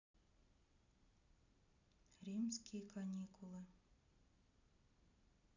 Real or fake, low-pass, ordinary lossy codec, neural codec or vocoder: real; 7.2 kHz; none; none